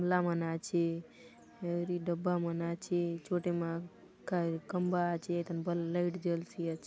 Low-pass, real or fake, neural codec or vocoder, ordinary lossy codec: none; real; none; none